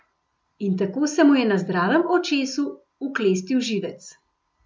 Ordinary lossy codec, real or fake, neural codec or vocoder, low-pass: none; real; none; none